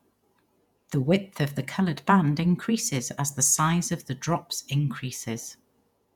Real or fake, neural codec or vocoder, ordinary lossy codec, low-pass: fake; vocoder, 48 kHz, 128 mel bands, Vocos; none; 19.8 kHz